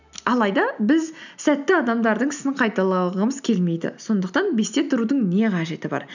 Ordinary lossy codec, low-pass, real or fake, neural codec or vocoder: none; 7.2 kHz; real; none